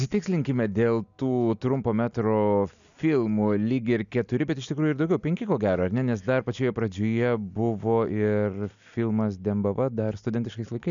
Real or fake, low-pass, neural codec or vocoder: real; 7.2 kHz; none